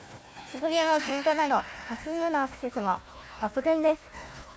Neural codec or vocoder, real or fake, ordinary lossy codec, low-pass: codec, 16 kHz, 1 kbps, FunCodec, trained on Chinese and English, 50 frames a second; fake; none; none